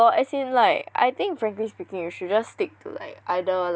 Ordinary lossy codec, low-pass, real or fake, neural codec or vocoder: none; none; real; none